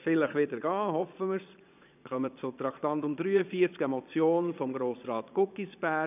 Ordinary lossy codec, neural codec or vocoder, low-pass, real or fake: none; vocoder, 22.05 kHz, 80 mel bands, Vocos; 3.6 kHz; fake